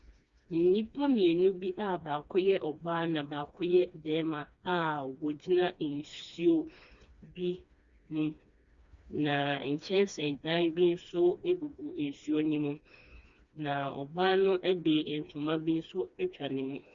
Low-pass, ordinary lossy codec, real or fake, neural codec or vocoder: 7.2 kHz; Opus, 64 kbps; fake; codec, 16 kHz, 2 kbps, FreqCodec, smaller model